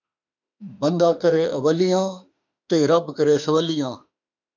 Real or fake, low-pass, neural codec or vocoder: fake; 7.2 kHz; autoencoder, 48 kHz, 32 numbers a frame, DAC-VAE, trained on Japanese speech